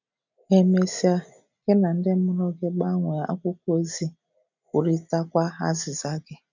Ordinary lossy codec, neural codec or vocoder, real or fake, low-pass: none; none; real; 7.2 kHz